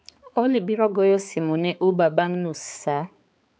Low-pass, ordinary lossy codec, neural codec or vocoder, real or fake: none; none; codec, 16 kHz, 4 kbps, X-Codec, HuBERT features, trained on balanced general audio; fake